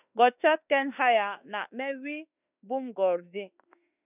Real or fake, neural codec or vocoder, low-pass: fake; autoencoder, 48 kHz, 32 numbers a frame, DAC-VAE, trained on Japanese speech; 3.6 kHz